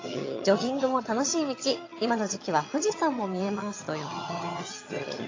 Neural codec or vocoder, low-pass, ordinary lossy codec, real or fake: vocoder, 22.05 kHz, 80 mel bands, HiFi-GAN; 7.2 kHz; AAC, 32 kbps; fake